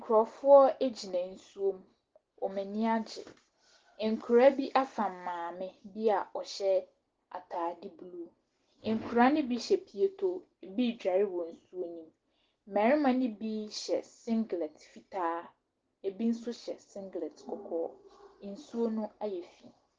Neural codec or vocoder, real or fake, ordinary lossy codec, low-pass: none; real; Opus, 16 kbps; 7.2 kHz